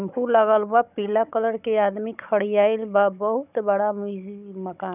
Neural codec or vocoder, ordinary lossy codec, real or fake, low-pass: codec, 16 kHz, 16 kbps, FunCodec, trained on Chinese and English, 50 frames a second; none; fake; 3.6 kHz